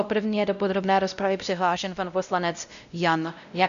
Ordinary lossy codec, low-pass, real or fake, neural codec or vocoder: AAC, 96 kbps; 7.2 kHz; fake; codec, 16 kHz, 0.5 kbps, X-Codec, WavLM features, trained on Multilingual LibriSpeech